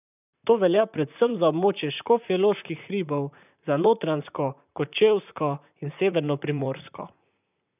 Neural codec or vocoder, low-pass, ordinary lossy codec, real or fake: vocoder, 44.1 kHz, 128 mel bands, Pupu-Vocoder; 3.6 kHz; none; fake